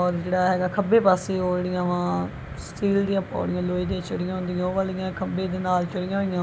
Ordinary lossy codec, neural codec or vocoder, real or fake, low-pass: none; none; real; none